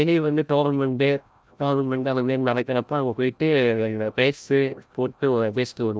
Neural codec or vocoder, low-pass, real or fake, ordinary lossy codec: codec, 16 kHz, 0.5 kbps, FreqCodec, larger model; none; fake; none